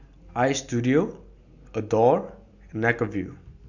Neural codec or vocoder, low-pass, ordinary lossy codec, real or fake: none; 7.2 kHz; Opus, 64 kbps; real